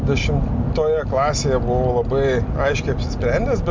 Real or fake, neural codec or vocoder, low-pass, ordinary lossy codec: real; none; 7.2 kHz; MP3, 64 kbps